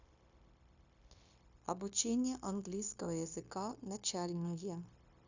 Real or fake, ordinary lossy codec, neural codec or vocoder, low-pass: fake; Opus, 64 kbps; codec, 16 kHz, 0.9 kbps, LongCat-Audio-Codec; 7.2 kHz